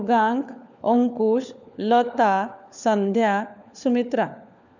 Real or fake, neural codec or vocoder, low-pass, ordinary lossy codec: fake; codec, 16 kHz, 4 kbps, FunCodec, trained on LibriTTS, 50 frames a second; 7.2 kHz; none